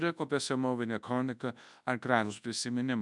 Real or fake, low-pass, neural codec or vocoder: fake; 10.8 kHz; codec, 24 kHz, 0.9 kbps, WavTokenizer, large speech release